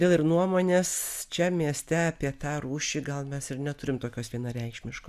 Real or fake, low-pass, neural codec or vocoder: real; 14.4 kHz; none